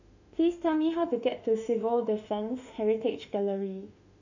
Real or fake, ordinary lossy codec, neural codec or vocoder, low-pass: fake; none; autoencoder, 48 kHz, 32 numbers a frame, DAC-VAE, trained on Japanese speech; 7.2 kHz